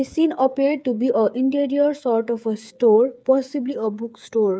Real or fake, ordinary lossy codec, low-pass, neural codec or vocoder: fake; none; none; codec, 16 kHz, 8 kbps, FreqCodec, smaller model